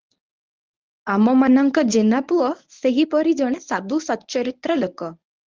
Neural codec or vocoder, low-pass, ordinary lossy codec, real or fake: codec, 24 kHz, 0.9 kbps, WavTokenizer, medium speech release version 1; 7.2 kHz; Opus, 32 kbps; fake